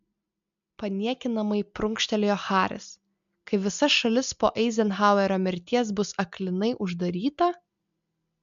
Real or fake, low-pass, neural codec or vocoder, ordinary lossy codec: real; 7.2 kHz; none; MP3, 64 kbps